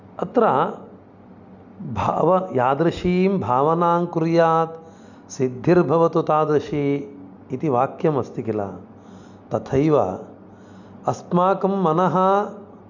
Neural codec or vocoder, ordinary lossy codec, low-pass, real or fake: none; none; 7.2 kHz; real